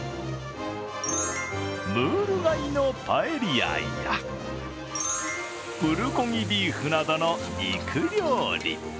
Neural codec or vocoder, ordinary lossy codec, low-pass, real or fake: none; none; none; real